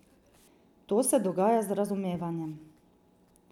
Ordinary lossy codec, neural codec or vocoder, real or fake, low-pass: none; none; real; 19.8 kHz